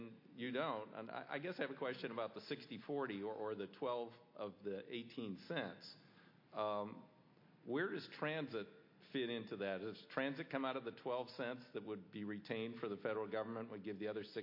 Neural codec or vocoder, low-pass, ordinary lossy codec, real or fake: none; 5.4 kHz; MP3, 32 kbps; real